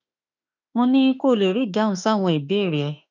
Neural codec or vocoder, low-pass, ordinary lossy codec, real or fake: autoencoder, 48 kHz, 32 numbers a frame, DAC-VAE, trained on Japanese speech; 7.2 kHz; none; fake